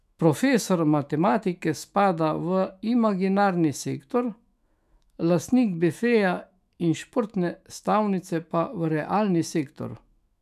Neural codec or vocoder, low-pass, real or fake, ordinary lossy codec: autoencoder, 48 kHz, 128 numbers a frame, DAC-VAE, trained on Japanese speech; 14.4 kHz; fake; none